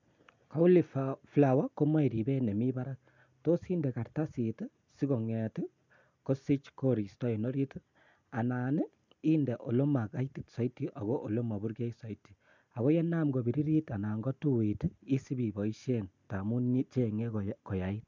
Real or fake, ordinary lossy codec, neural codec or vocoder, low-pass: real; MP3, 64 kbps; none; 7.2 kHz